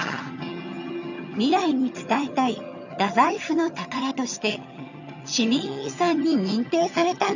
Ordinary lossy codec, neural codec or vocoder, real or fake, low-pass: none; vocoder, 22.05 kHz, 80 mel bands, HiFi-GAN; fake; 7.2 kHz